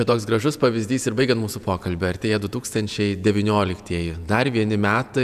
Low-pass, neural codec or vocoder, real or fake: 14.4 kHz; vocoder, 48 kHz, 128 mel bands, Vocos; fake